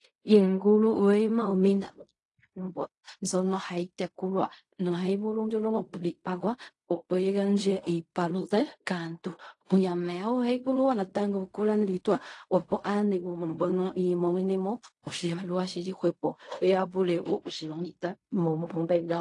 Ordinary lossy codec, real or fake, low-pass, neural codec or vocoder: AAC, 48 kbps; fake; 10.8 kHz; codec, 16 kHz in and 24 kHz out, 0.4 kbps, LongCat-Audio-Codec, fine tuned four codebook decoder